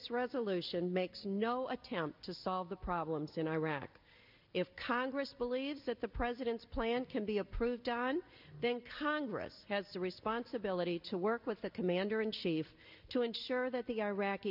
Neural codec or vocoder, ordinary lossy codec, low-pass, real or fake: none; MP3, 48 kbps; 5.4 kHz; real